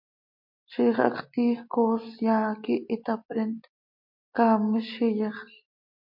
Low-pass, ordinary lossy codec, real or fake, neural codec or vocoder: 5.4 kHz; MP3, 32 kbps; real; none